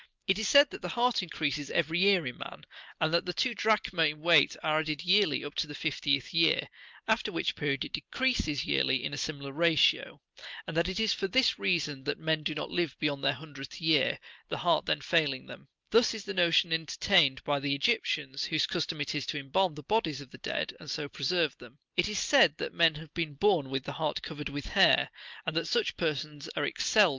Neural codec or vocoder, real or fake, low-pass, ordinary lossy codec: none; real; 7.2 kHz; Opus, 32 kbps